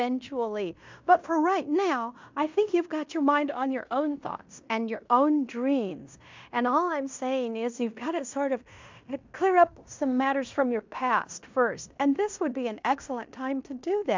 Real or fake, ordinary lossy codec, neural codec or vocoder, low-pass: fake; MP3, 64 kbps; codec, 16 kHz in and 24 kHz out, 0.9 kbps, LongCat-Audio-Codec, fine tuned four codebook decoder; 7.2 kHz